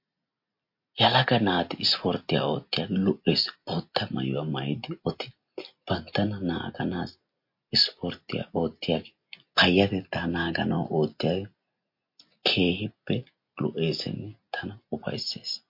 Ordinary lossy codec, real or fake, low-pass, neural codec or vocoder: MP3, 32 kbps; real; 5.4 kHz; none